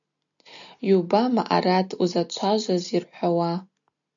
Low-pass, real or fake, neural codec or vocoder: 7.2 kHz; real; none